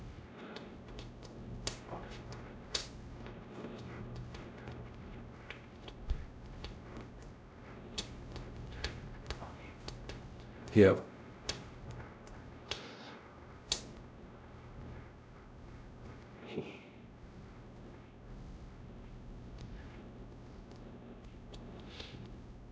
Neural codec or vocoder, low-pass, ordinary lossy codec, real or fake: codec, 16 kHz, 0.5 kbps, X-Codec, WavLM features, trained on Multilingual LibriSpeech; none; none; fake